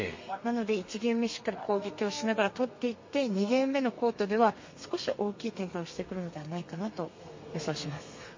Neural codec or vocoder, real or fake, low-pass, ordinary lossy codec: codec, 32 kHz, 1.9 kbps, SNAC; fake; 7.2 kHz; MP3, 32 kbps